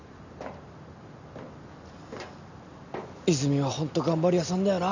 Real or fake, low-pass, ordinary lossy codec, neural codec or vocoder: real; 7.2 kHz; none; none